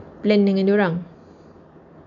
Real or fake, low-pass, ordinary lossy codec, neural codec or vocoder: real; 7.2 kHz; none; none